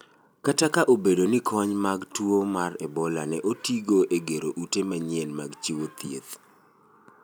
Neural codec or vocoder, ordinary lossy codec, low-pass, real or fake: none; none; none; real